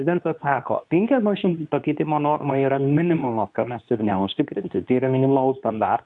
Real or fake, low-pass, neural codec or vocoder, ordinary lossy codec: fake; 10.8 kHz; codec, 24 kHz, 0.9 kbps, WavTokenizer, medium speech release version 2; MP3, 96 kbps